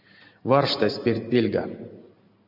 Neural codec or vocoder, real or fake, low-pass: vocoder, 44.1 kHz, 128 mel bands every 512 samples, BigVGAN v2; fake; 5.4 kHz